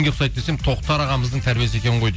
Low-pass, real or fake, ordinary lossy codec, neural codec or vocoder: none; real; none; none